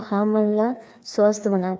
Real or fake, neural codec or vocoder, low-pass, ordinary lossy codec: fake; codec, 16 kHz, 2 kbps, FreqCodec, larger model; none; none